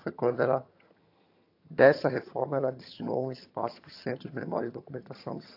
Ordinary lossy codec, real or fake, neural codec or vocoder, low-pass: AAC, 32 kbps; fake; vocoder, 22.05 kHz, 80 mel bands, HiFi-GAN; 5.4 kHz